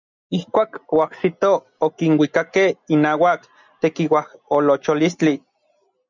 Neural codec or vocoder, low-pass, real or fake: none; 7.2 kHz; real